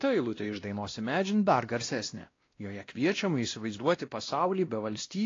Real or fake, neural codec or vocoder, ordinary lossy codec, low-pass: fake; codec, 16 kHz, 1 kbps, X-Codec, WavLM features, trained on Multilingual LibriSpeech; AAC, 32 kbps; 7.2 kHz